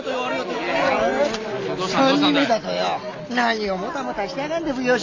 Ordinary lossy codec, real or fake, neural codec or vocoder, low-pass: AAC, 32 kbps; real; none; 7.2 kHz